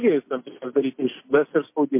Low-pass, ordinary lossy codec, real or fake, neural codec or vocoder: 3.6 kHz; MP3, 24 kbps; real; none